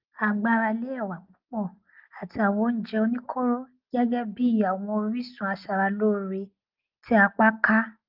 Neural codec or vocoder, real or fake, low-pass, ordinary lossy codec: none; real; 5.4 kHz; Opus, 24 kbps